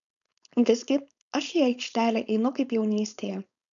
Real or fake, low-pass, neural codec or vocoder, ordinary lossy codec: fake; 7.2 kHz; codec, 16 kHz, 4.8 kbps, FACodec; MP3, 96 kbps